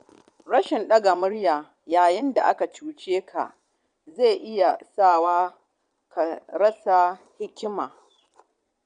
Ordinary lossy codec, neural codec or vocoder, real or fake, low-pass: none; vocoder, 22.05 kHz, 80 mel bands, Vocos; fake; 9.9 kHz